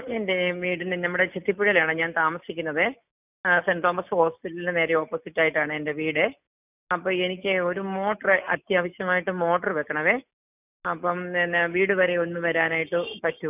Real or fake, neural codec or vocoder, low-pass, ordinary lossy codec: real; none; 3.6 kHz; none